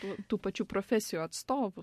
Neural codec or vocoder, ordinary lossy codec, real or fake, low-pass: none; MP3, 64 kbps; real; 14.4 kHz